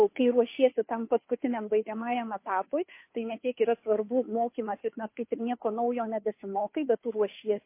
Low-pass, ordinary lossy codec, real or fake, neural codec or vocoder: 3.6 kHz; MP3, 24 kbps; fake; codec, 16 kHz, 2 kbps, FunCodec, trained on Chinese and English, 25 frames a second